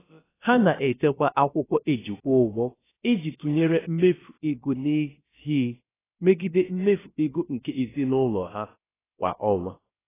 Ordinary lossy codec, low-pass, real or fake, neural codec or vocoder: AAC, 16 kbps; 3.6 kHz; fake; codec, 16 kHz, about 1 kbps, DyCAST, with the encoder's durations